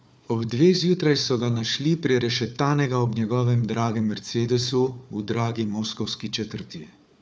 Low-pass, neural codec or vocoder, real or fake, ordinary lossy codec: none; codec, 16 kHz, 4 kbps, FunCodec, trained on Chinese and English, 50 frames a second; fake; none